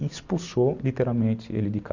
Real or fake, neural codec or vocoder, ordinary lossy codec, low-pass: real; none; none; 7.2 kHz